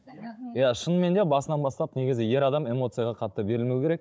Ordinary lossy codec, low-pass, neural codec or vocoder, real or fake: none; none; codec, 16 kHz, 16 kbps, FunCodec, trained on Chinese and English, 50 frames a second; fake